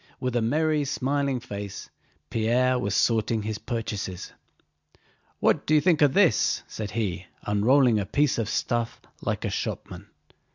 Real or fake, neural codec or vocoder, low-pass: real; none; 7.2 kHz